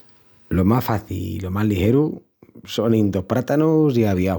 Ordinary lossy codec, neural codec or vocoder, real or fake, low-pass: none; none; real; none